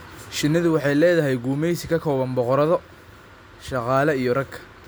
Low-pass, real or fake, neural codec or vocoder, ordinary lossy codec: none; real; none; none